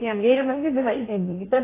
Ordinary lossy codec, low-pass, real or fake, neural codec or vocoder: AAC, 16 kbps; 3.6 kHz; fake; codec, 16 kHz in and 24 kHz out, 0.6 kbps, FocalCodec, streaming, 2048 codes